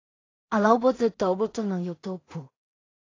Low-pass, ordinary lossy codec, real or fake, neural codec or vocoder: 7.2 kHz; AAC, 32 kbps; fake; codec, 16 kHz in and 24 kHz out, 0.4 kbps, LongCat-Audio-Codec, two codebook decoder